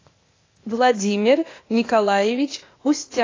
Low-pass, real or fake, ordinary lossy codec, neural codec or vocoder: 7.2 kHz; fake; AAC, 32 kbps; codec, 16 kHz, 0.8 kbps, ZipCodec